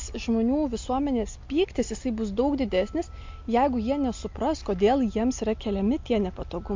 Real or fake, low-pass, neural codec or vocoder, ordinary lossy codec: real; 7.2 kHz; none; MP3, 48 kbps